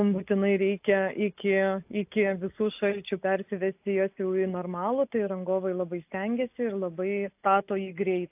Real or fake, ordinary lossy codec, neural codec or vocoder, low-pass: real; AAC, 32 kbps; none; 3.6 kHz